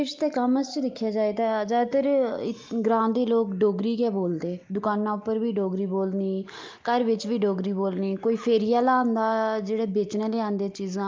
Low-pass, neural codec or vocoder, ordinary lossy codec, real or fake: 7.2 kHz; none; Opus, 32 kbps; real